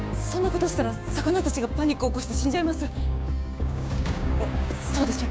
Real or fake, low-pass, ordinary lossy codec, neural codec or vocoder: fake; none; none; codec, 16 kHz, 6 kbps, DAC